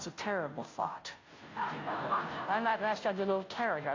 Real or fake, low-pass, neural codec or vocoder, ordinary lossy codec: fake; 7.2 kHz; codec, 16 kHz, 0.5 kbps, FunCodec, trained on Chinese and English, 25 frames a second; AAC, 32 kbps